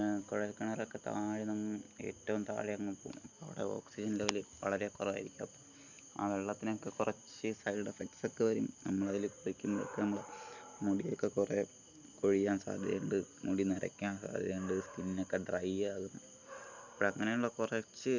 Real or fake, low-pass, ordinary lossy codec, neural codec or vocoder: real; 7.2 kHz; none; none